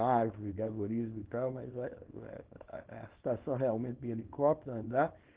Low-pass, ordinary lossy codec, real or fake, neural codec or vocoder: 3.6 kHz; Opus, 16 kbps; fake; codec, 24 kHz, 0.9 kbps, WavTokenizer, small release